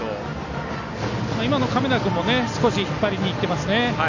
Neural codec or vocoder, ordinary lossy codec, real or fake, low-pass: none; none; real; 7.2 kHz